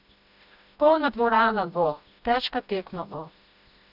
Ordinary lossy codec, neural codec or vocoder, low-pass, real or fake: none; codec, 16 kHz, 1 kbps, FreqCodec, smaller model; 5.4 kHz; fake